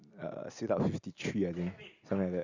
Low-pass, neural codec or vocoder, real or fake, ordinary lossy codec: 7.2 kHz; none; real; Opus, 64 kbps